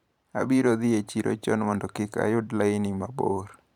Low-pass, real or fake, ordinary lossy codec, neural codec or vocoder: 19.8 kHz; fake; none; vocoder, 44.1 kHz, 128 mel bands every 512 samples, BigVGAN v2